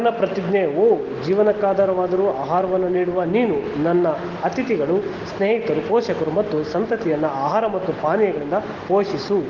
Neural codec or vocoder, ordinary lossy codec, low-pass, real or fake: none; Opus, 16 kbps; 7.2 kHz; real